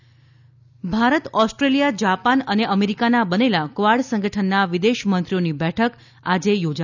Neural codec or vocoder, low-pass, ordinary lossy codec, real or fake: none; 7.2 kHz; none; real